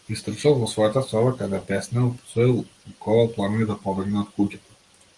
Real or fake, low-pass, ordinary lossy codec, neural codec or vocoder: real; 10.8 kHz; Opus, 24 kbps; none